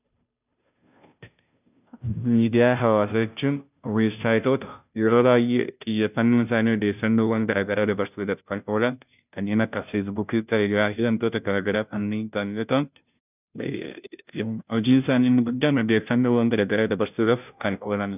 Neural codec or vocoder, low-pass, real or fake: codec, 16 kHz, 0.5 kbps, FunCodec, trained on Chinese and English, 25 frames a second; 3.6 kHz; fake